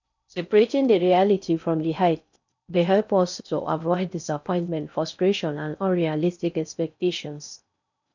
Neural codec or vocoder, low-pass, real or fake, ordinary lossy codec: codec, 16 kHz in and 24 kHz out, 0.6 kbps, FocalCodec, streaming, 4096 codes; 7.2 kHz; fake; none